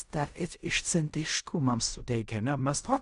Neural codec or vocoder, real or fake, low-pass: codec, 16 kHz in and 24 kHz out, 0.4 kbps, LongCat-Audio-Codec, fine tuned four codebook decoder; fake; 10.8 kHz